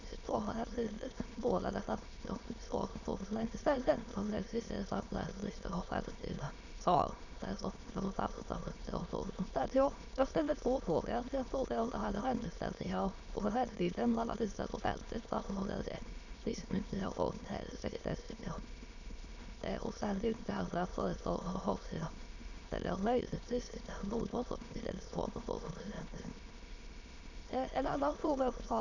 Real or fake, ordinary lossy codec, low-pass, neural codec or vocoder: fake; none; 7.2 kHz; autoencoder, 22.05 kHz, a latent of 192 numbers a frame, VITS, trained on many speakers